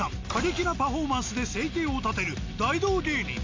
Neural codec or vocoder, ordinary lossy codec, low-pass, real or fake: none; MP3, 48 kbps; 7.2 kHz; real